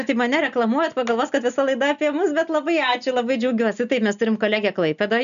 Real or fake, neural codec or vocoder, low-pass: real; none; 7.2 kHz